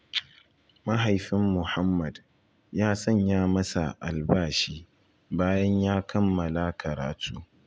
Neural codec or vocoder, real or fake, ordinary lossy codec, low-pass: none; real; none; none